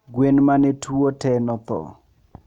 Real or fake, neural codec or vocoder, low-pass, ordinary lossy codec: real; none; 19.8 kHz; none